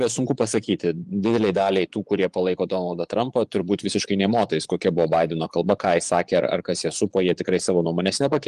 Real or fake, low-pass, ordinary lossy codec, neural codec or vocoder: fake; 10.8 kHz; Opus, 24 kbps; vocoder, 24 kHz, 100 mel bands, Vocos